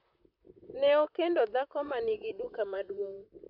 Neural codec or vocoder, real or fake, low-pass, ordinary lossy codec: vocoder, 44.1 kHz, 128 mel bands, Pupu-Vocoder; fake; 5.4 kHz; none